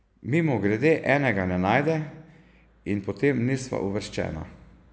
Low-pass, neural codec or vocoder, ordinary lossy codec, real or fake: none; none; none; real